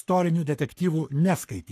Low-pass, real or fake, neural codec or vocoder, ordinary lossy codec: 14.4 kHz; fake; codec, 44.1 kHz, 3.4 kbps, Pupu-Codec; AAC, 64 kbps